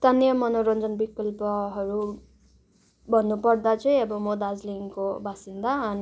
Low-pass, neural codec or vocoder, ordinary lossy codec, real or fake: none; none; none; real